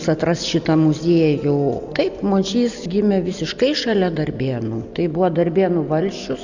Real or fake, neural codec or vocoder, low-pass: real; none; 7.2 kHz